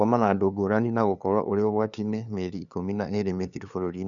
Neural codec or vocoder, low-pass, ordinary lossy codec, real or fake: codec, 16 kHz, 2 kbps, FunCodec, trained on LibriTTS, 25 frames a second; 7.2 kHz; none; fake